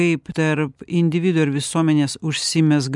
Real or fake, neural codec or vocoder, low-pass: real; none; 10.8 kHz